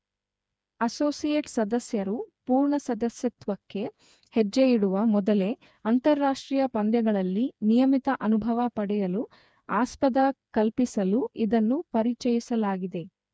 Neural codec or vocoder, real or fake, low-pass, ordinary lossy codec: codec, 16 kHz, 4 kbps, FreqCodec, smaller model; fake; none; none